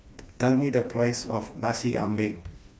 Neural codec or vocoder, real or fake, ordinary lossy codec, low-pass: codec, 16 kHz, 2 kbps, FreqCodec, smaller model; fake; none; none